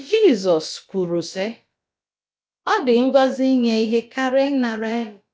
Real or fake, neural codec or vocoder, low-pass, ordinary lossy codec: fake; codec, 16 kHz, about 1 kbps, DyCAST, with the encoder's durations; none; none